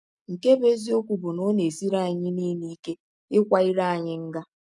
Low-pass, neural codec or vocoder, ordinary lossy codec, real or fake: none; none; none; real